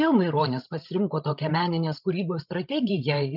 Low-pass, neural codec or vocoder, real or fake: 5.4 kHz; codec, 16 kHz, 16 kbps, FreqCodec, larger model; fake